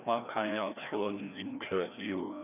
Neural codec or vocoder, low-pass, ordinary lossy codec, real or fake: codec, 16 kHz, 1 kbps, FreqCodec, larger model; 3.6 kHz; none; fake